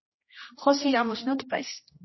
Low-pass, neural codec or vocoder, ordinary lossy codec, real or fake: 7.2 kHz; codec, 16 kHz, 1 kbps, X-Codec, HuBERT features, trained on general audio; MP3, 24 kbps; fake